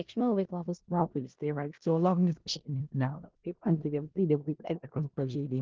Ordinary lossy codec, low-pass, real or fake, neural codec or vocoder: Opus, 16 kbps; 7.2 kHz; fake; codec, 16 kHz in and 24 kHz out, 0.4 kbps, LongCat-Audio-Codec, four codebook decoder